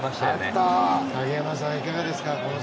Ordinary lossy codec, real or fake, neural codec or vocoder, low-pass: none; real; none; none